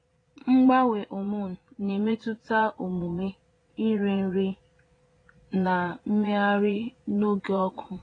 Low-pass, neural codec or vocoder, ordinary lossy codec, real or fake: 9.9 kHz; vocoder, 22.05 kHz, 80 mel bands, Vocos; AAC, 32 kbps; fake